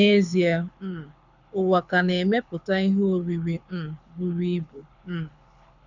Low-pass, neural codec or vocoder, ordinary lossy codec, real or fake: 7.2 kHz; codec, 24 kHz, 6 kbps, HILCodec; none; fake